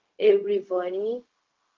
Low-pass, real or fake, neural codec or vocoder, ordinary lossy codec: 7.2 kHz; fake; codec, 16 kHz, 0.4 kbps, LongCat-Audio-Codec; Opus, 32 kbps